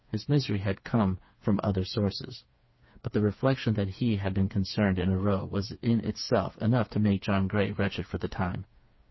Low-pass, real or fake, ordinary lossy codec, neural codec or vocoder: 7.2 kHz; fake; MP3, 24 kbps; codec, 16 kHz, 4 kbps, FreqCodec, smaller model